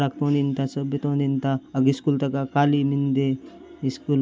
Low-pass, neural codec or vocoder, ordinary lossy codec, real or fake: none; none; none; real